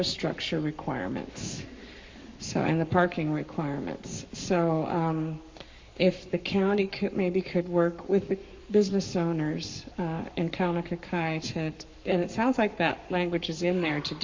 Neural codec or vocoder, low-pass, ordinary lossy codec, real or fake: codec, 44.1 kHz, 7.8 kbps, Pupu-Codec; 7.2 kHz; MP3, 48 kbps; fake